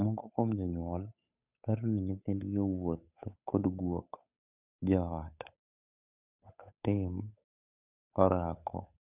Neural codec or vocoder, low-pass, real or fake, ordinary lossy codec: codec, 16 kHz, 8 kbps, FunCodec, trained on Chinese and English, 25 frames a second; 3.6 kHz; fake; none